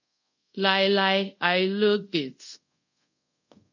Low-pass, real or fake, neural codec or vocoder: 7.2 kHz; fake; codec, 24 kHz, 0.5 kbps, DualCodec